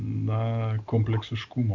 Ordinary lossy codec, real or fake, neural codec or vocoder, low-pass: MP3, 64 kbps; real; none; 7.2 kHz